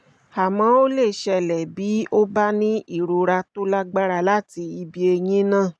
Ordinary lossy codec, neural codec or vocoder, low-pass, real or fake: none; none; none; real